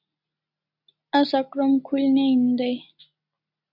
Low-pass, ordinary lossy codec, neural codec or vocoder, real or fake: 5.4 kHz; AAC, 48 kbps; none; real